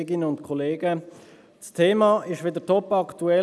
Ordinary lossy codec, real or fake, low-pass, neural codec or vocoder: none; real; none; none